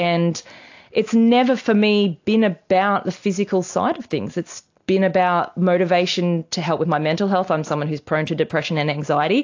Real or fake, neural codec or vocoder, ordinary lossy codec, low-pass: real; none; AAC, 48 kbps; 7.2 kHz